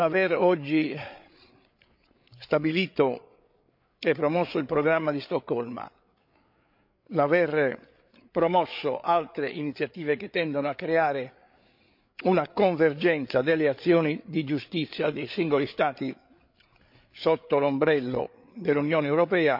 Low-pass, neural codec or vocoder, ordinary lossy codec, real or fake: 5.4 kHz; codec, 16 kHz, 8 kbps, FreqCodec, larger model; MP3, 48 kbps; fake